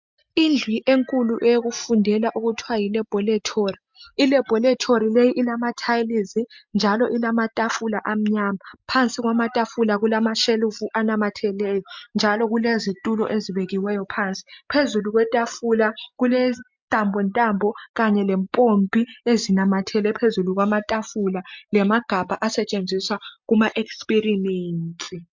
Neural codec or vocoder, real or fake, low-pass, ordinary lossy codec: none; real; 7.2 kHz; MP3, 64 kbps